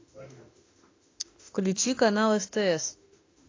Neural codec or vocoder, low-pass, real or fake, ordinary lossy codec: autoencoder, 48 kHz, 32 numbers a frame, DAC-VAE, trained on Japanese speech; 7.2 kHz; fake; AAC, 32 kbps